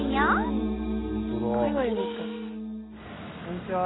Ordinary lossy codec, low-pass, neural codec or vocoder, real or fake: AAC, 16 kbps; 7.2 kHz; none; real